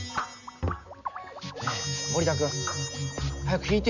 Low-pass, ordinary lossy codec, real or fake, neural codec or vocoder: 7.2 kHz; none; real; none